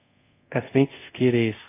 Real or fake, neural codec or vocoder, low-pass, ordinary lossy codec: fake; codec, 24 kHz, 0.5 kbps, DualCodec; 3.6 kHz; AAC, 24 kbps